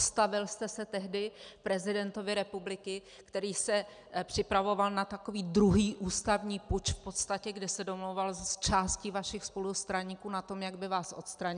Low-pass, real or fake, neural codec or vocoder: 9.9 kHz; real; none